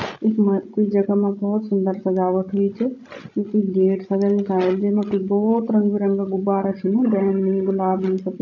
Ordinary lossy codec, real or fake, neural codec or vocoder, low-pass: none; fake; codec, 16 kHz, 16 kbps, FreqCodec, larger model; 7.2 kHz